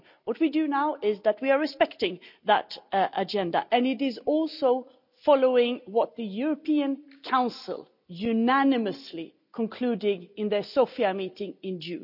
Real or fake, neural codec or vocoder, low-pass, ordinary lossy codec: real; none; 5.4 kHz; none